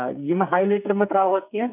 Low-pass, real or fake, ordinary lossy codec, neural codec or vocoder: 3.6 kHz; fake; none; codec, 44.1 kHz, 2.6 kbps, SNAC